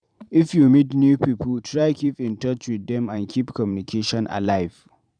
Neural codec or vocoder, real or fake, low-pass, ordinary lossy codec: vocoder, 44.1 kHz, 128 mel bands every 512 samples, BigVGAN v2; fake; 9.9 kHz; none